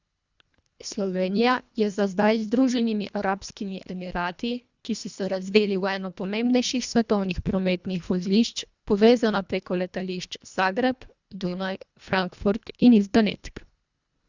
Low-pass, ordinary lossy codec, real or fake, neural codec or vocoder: 7.2 kHz; Opus, 64 kbps; fake; codec, 24 kHz, 1.5 kbps, HILCodec